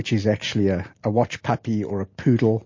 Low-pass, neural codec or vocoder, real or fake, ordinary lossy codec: 7.2 kHz; none; real; MP3, 32 kbps